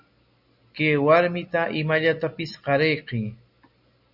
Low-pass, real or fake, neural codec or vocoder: 5.4 kHz; real; none